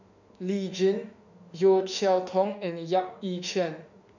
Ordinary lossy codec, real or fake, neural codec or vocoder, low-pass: none; fake; autoencoder, 48 kHz, 32 numbers a frame, DAC-VAE, trained on Japanese speech; 7.2 kHz